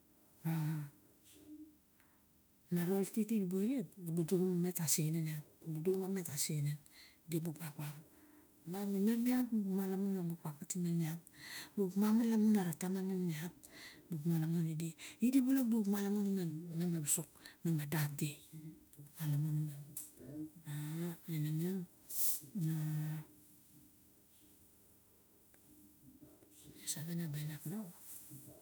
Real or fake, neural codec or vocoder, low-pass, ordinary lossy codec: fake; autoencoder, 48 kHz, 32 numbers a frame, DAC-VAE, trained on Japanese speech; none; none